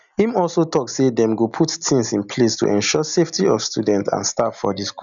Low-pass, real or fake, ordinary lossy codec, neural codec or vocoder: 7.2 kHz; real; none; none